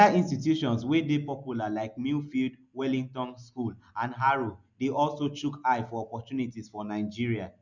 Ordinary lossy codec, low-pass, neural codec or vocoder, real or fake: none; 7.2 kHz; none; real